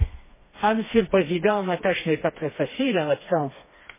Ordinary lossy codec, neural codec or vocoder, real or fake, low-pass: MP3, 16 kbps; codec, 16 kHz, 2 kbps, FreqCodec, smaller model; fake; 3.6 kHz